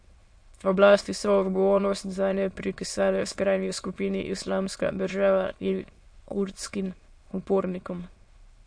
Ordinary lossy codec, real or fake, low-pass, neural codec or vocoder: MP3, 48 kbps; fake; 9.9 kHz; autoencoder, 22.05 kHz, a latent of 192 numbers a frame, VITS, trained on many speakers